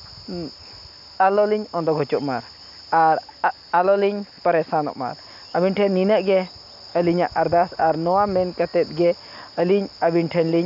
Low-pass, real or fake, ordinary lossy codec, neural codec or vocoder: 5.4 kHz; real; none; none